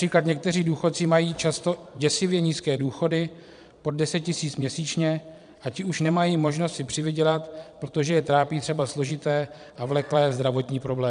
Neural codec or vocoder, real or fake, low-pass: vocoder, 22.05 kHz, 80 mel bands, Vocos; fake; 9.9 kHz